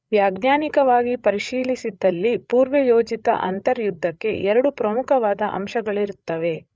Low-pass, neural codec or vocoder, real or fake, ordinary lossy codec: none; codec, 16 kHz, 8 kbps, FreqCodec, larger model; fake; none